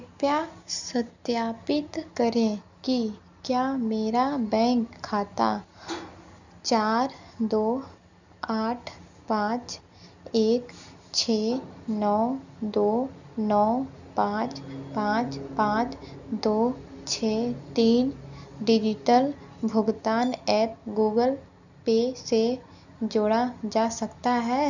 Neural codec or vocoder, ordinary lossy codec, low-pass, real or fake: none; none; 7.2 kHz; real